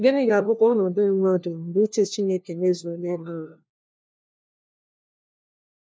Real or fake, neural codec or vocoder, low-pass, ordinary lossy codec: fake; codec, 16 kHz, 1 kbps, FunCodec, trained on LibriTTS, 50 frames a second; none; none